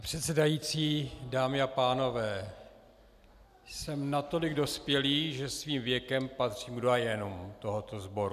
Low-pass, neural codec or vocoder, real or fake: 14.4 kHz; none; real